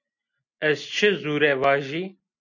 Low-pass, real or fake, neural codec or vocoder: 7.2 kHz; real; none